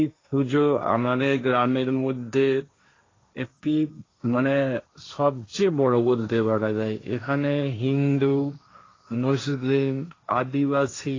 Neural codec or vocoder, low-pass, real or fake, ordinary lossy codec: codec, 16 kHz, 1.1 kbps, Voila-Tokenizer; 7.2 kHz; fake; AAC, 32 kbps